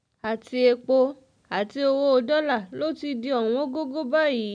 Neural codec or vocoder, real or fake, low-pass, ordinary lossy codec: none; real; 9.9 kHz; none